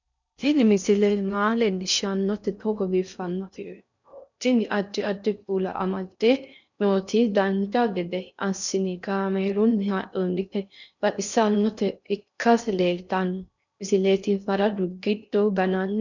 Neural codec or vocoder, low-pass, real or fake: codec, 16 kHz in and 24 kHz out, 0.6 kbps, FocalCodec, streaming, 4096 codes; 7.2 kHz; fake